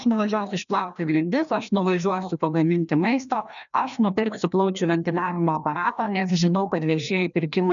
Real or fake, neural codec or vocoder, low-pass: fake; codec, 16 kHz, 1 kbps, FreqCodec, larger model; 7.2 kHz